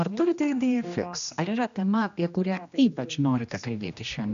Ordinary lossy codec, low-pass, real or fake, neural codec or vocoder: AAC, 96 kbps; 7.2 kHz; fake; codec, 16 kHz, 1 kbps, X-Codec, HuBERT features, trained on general audio